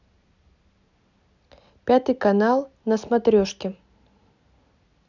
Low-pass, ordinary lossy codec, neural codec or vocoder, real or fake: 7.2 kHz; none; none; real